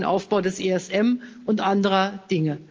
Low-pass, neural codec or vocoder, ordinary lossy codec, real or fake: 7.2 kHz; none; Opus, 24 kbps; real